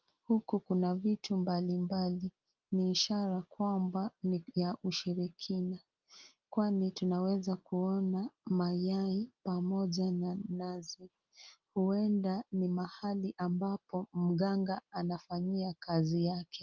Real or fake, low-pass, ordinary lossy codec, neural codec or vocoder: real; 7.2 kHz; Opus, 32 kbps; none